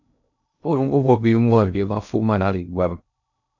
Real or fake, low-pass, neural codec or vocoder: fake; 7.2 kHz; codec, 16 kHz in and 24 kHz out, 0.6 kbps, FocalCodec, streaming, 2048 codes